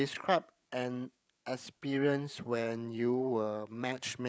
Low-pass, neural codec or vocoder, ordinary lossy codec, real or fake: none; codec, 16 kHz, 8 kbps, FreqCodec, larger model; none; fake